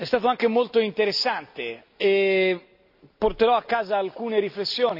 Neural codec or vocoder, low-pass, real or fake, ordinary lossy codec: none; 5.4 kHz; real; AAC, 48 kbps